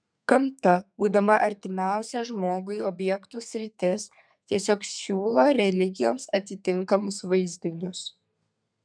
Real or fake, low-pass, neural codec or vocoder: fake; 9.9 kHz; codec, 32 kHz, 1.9 kbps, SNAC